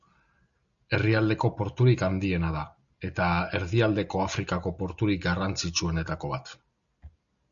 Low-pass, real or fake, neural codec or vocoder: 7.2 kHz; real; none